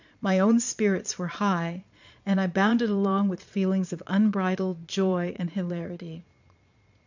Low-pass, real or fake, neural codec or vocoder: 7.2 kHz; fake; vocoder, 22.05 kHz, 80 mel bands, Vocos